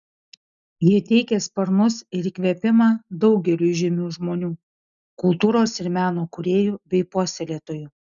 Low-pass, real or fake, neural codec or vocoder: 7.2 kHz; real; none